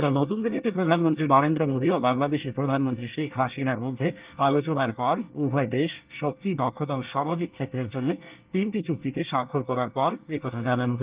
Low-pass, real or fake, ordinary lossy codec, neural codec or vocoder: 3.6 kHz; fake; Opus, 32 kbps; codec, 24 kHz, 1 kbps, SNAC